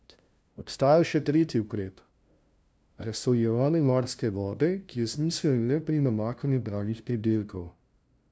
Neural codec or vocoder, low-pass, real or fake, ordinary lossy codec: codec, 16 kHz, 0.5 kbps, FunCodec, trained on LibriTTS, 25 frames a second; none; fake; none